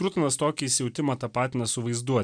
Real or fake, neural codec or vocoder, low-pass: real; none; 9.9 kHz